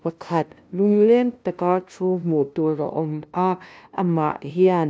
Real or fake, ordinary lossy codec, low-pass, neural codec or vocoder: fake; none; none; codec, 16 kHz, 0.5 kbps, FunCodec, trained on LibriTTS, 25 frames a second